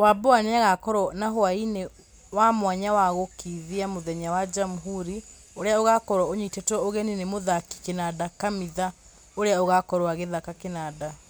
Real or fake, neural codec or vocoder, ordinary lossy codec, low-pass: real; none; none; none